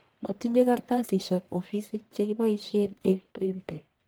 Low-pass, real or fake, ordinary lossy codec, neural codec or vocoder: none; fake; none; codec, 44.1 kHz, 1.7 kbps, Pupu-Codec